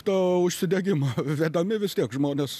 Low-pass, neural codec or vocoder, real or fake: 14.4 kHz; none; real